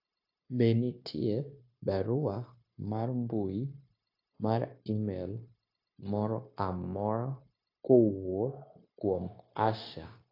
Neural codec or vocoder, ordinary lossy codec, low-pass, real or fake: codec, 16 kHz, 0.9 kbps, LongCat-Audio-Codec; AAC, 32 kbps; 5.4 kHz; fake